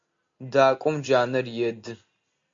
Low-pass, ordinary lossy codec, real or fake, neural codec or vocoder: 7.2 kHz; AAC, 64 kbps; real; none